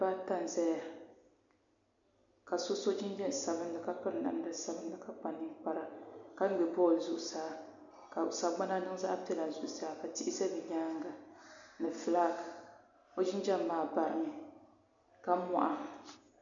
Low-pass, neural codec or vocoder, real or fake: 7.2 kHz; none; real